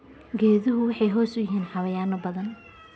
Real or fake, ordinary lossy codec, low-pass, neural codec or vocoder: real; none; none; none